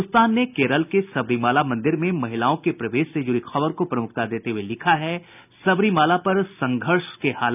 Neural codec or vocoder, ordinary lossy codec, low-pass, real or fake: none; none; 3.6 kHz; real